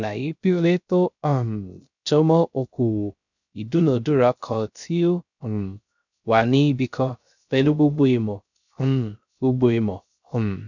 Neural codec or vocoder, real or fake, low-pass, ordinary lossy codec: codec, 16 kHz, 0.3 kbps, FocalCodec; fake; 7.2 kHz; none